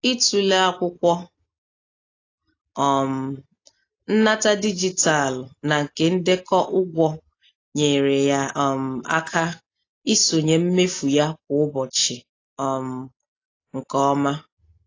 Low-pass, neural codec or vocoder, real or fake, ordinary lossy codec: 7.2 kHz; none; real; AAC, 32 kbps